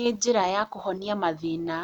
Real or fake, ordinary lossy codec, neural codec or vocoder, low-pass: real; none; none; 19.8 kHz